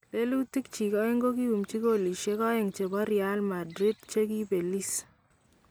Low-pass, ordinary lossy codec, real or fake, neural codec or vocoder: none; none; real; none